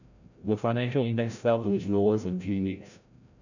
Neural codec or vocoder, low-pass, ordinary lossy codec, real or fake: codec, 16 kHz, 0.5 kbps, FreqCodec, larger model; 7.2 kHz; none; fake